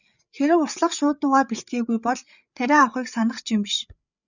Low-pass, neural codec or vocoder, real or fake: 7.2 kHz; codec, 16 kHz, 8 kbps, FreqCodec, larger model; fake